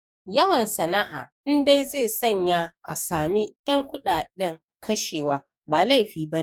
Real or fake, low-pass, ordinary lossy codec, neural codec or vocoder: fake; 19.8 kHz; none; codec, 44.1 kHz, 2.6 kbps, DAC